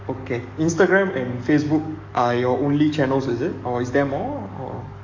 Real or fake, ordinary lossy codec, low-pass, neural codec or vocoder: fake; AAC, 48 kbps; 7.2 kHz; codec, 44.1 kHz, 7.8 kbps, DAC